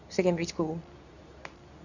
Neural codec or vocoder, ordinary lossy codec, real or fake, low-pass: codec, 24 kHz, 0.9 kbps, WavTokenizer, medium speech release version 2; none; fake; 7.2 kHz